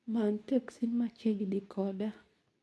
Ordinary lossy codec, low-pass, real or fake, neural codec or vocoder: none; none; fake; codec, 24 kHz, 0.9 kbps, WavTokenizer, medium speech release version 2